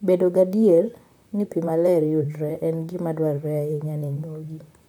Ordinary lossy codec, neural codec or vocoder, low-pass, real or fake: none; vocoder, 44.1 kHz, 128 mel bands, Pupu-Vocoder; none; fake